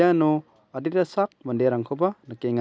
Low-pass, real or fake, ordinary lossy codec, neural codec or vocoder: none; real; none; none